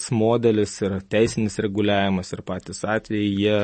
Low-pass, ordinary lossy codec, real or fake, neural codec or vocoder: 10.8 kHz; MP3, 32 kbps; real; none